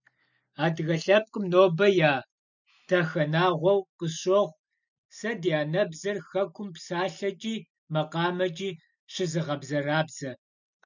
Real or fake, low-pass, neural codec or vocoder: real; 7.2 kHz; none